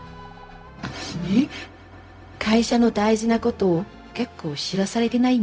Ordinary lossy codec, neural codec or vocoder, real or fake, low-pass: none; codec, 16 kHz, 0.4 kbps, LongCat-Audio-Codec; fake; none